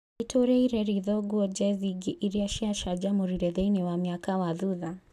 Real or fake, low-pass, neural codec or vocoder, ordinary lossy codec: real; 14.4 kHz; none; none